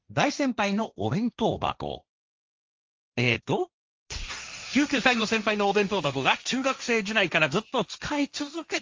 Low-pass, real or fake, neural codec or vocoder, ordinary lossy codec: 7.2 kHz; fake; codec, 16 kHz, 1.1 kbps, Voila-Tokenizer; Opus, 32 kbps